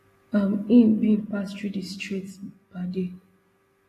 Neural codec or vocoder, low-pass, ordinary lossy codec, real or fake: none; 14.4 kHz; AAC, 48 kbps; real